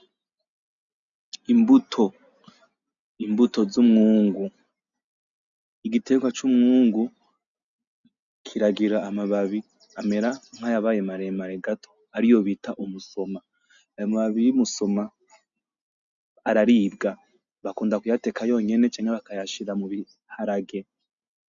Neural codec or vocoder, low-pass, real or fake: none; 7.2 kHz; real